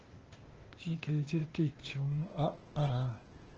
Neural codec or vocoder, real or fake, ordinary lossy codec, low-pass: codec, 16 kHz, 0.8 kbps, ZipCodec; fake; Opus, 16 kbps; 7.2 kHz